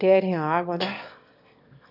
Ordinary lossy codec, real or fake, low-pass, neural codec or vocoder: none; fake; 5.4 kHz; autoencoder, 22.05 kHz, a latent of 192 numbers a frame, VITS, trained on one speaker